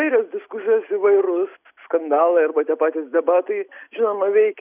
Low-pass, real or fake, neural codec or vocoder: 3.6 kHz; real; none